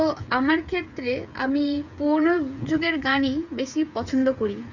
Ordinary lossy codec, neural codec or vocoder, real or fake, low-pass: none; codec, 16 kHz, 8 kbps, FreqCodec, smaller model; fake; 7.2 kHz